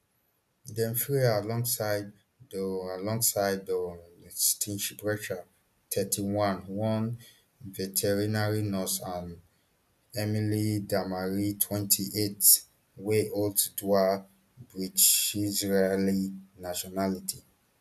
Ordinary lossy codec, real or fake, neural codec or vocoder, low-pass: none; real; none; 14.4 kHz